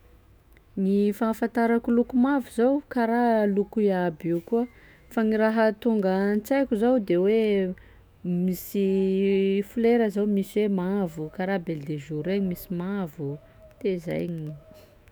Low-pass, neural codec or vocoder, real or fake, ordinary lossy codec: none; autoencoder, 48 kHz, 128 numbers a frame, DAC-VAE, trained on Japanese speech; fake; none